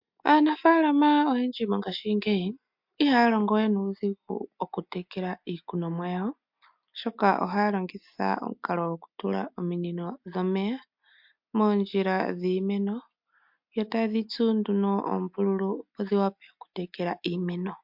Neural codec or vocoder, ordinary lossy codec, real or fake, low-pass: none; MP3, 48 kbps; real; 5.4 kHz